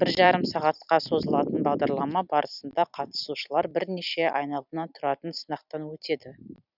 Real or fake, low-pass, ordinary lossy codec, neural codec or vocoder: fake; 5.4 kHz; none; vocoder, 44.1 kHz, 128 mel bands every 256 samples, BigVGAN v2